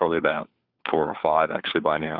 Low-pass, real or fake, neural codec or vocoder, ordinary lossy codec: 5.4 kHz; fake; codec, 16 kHz, 4 kbps, FunCodec, trained on LibriTTS, 50 frames a second; Opus, 64 kbps